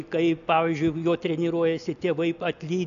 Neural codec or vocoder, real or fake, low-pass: none; real; 7.2 kHz